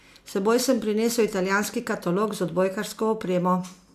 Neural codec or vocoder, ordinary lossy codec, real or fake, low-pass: none; MP3, 96 kbps; real; 14.4 kHz